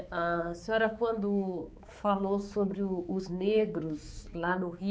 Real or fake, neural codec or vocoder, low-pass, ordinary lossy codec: fake; codec, 16 kHz, 4 kbps, X-Codec, HuBERT features, trained on balanced general audio; none; none